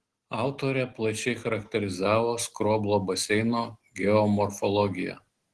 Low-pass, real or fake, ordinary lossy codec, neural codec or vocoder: 10.8 kHz; real; Opus, 16 kbps; none